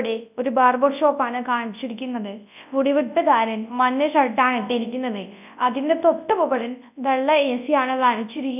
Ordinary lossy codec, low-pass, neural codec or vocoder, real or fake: none; 3.6 kHz; codec, 24 kHz, 0.9 kbps, WavTokenizer, large speech release; fake